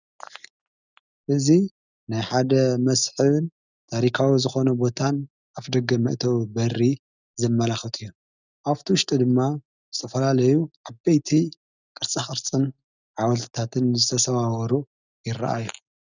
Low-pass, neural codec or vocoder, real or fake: 7.2 kHz; none; real